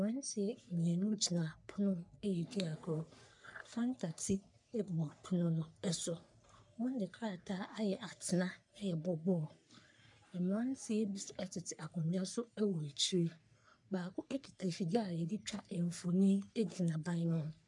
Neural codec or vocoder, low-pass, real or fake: codec, 44.1 kHz, 3.4 kbps, Pupu-Codec; 10.8 kHz; fake